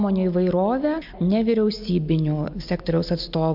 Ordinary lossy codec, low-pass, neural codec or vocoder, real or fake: MP3, 48 kbps; 5.4 kHz; none; real